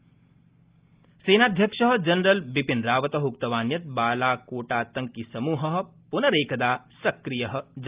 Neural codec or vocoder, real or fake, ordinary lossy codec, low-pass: none; real; Opus, 32 kbps; 3.6 kHz